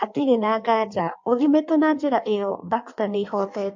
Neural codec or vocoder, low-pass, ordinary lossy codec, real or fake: codec, 16 kHz in and 24 kHz out, 1.1 kbps, FireRedTTS-2 codec; 7.2 kHz; MP3, 48 kbps; fake